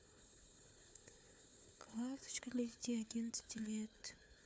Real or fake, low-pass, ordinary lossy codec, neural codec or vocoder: fake; none; none; codec, 16 kHz, 4 kbps, FunCodec, trained on Chinese and English, 50 frames a second